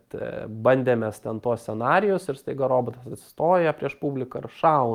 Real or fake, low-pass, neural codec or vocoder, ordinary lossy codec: real; 19.8 kHz; none; Opus, 32 kbps